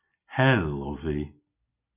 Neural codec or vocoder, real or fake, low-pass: none; real; 3.6 kHz